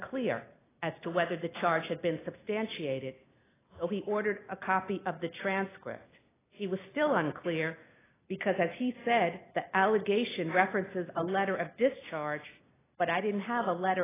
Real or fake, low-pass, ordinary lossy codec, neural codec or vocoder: real; 3.6 kHz; AAC, 16 kbps; none